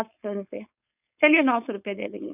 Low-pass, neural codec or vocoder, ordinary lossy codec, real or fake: 3.6 kHz; codec, 16 kHz, 4.8 kbps, FACodec; none; fake